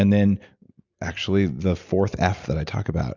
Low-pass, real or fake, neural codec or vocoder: 7.2 kHz; real; none